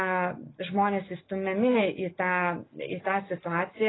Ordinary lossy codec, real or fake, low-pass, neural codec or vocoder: AAC, 16 kbps; real; 7.2 kHz; none